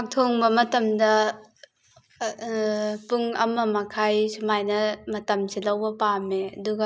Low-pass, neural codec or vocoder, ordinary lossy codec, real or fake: none; none; none; real